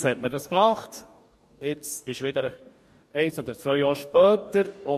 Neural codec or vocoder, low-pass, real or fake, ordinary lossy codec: codec, 44.1 kHz, 2.6 kbps, DAC; 14.4 kHz; fake; MP3, 64 kbps